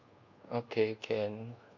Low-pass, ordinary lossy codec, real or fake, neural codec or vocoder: 7.2 kHz; Opus, 64 kbps; fake; codec, 24 kHz, 1.2 kbps, DualCodec